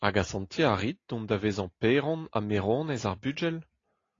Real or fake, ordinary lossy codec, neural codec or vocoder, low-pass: real; AAC, 32 kbps; none; 7.2 kHz